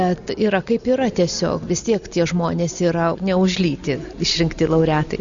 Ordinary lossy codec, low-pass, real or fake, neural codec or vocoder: Opus, 64 kbps; 7.2 kHz; real; none